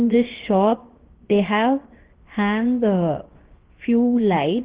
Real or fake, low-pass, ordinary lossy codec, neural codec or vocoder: fake; 3.6 kHz; Opus, 16 kbps; codec, 16 kHz, 0.7 kbps, FocalCodec